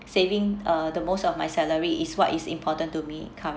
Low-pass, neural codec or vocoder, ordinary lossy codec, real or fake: none; none; none; real